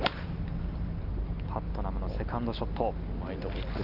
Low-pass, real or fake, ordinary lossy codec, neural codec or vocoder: 5.4 kHz; real; Opus, 24 kbps; none